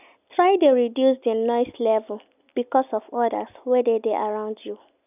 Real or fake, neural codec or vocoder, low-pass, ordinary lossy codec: real; none; 3.6 kHz; none